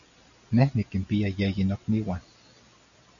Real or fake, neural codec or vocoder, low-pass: real; none; 7.2 kHz